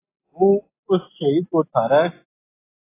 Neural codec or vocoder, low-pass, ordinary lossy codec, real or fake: none; 3.6 kHz; AAC, 16 kbps; real